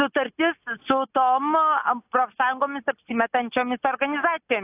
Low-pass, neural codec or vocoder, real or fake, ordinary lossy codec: 3.6 kHz; none; real; AAC, 32 kbps